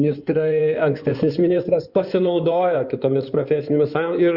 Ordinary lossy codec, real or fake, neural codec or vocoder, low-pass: Opus, 64 kbps; fake; codec, 16 kHz in and 24 kHz out, 2.2 kbps, FireRedTTS-2 codec; 5.4 kHz